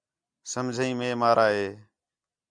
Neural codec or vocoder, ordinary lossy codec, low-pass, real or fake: none; MP3, 96 kbps; 9.9 kHz; real